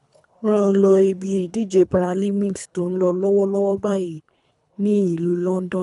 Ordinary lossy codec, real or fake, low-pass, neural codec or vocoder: none; fake; 10.8 kHz; codec, 24 kHz, 3 kbps, HILCodec